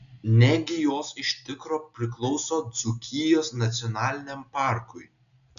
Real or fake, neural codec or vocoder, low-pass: real; none; 7.2 kHz